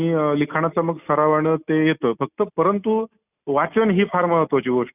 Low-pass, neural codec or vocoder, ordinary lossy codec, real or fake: 3.6 kHz; none; none; real